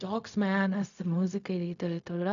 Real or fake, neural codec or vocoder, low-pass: fake; codec, 16 kHz, 0.4 kbps, LongCat-Audio-Codec; 7.2 kHz